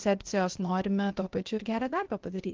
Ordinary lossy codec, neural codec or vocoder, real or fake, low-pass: Opus, 16 kbps; codec, 16 kHz, 0.8 kbps, ZipCodec; fake; 7.2 kHz